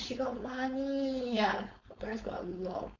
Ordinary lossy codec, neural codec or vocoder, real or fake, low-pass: none; codec, 16 kHz, 4.8 kbps, FACodec; fake; 7.2 kHz